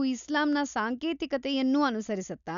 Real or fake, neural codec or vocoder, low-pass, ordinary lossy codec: real; none; 7.2 kHz; none